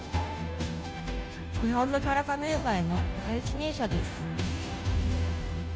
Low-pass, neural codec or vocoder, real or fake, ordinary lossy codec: none; codec, 16 kHz, 0.5 kbps, FunCodec, trained on Chinese and English, 25 frames a second; fake; none